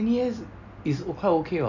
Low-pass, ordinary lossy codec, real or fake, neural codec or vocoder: 7.2 kHz; none; real; none